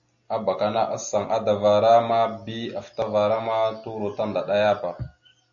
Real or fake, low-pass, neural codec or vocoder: real; 7.2 kHz; none